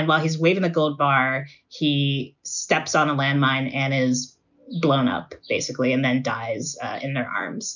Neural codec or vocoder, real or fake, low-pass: none; real; 7.2 kHz